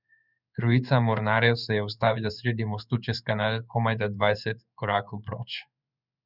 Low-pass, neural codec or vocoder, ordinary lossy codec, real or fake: 5.4 kHz; codec, 16 kHz in and 24 kHz out, 1 kbps, XY-Tokenizer; none; fake